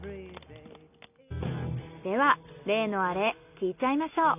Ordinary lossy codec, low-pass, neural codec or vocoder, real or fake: none; 3.6 kHz; none; real